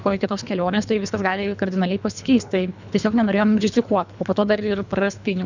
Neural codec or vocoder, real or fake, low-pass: codec, 24 kHz, 3 kbps, HILCodec; fake; 7.2 kHz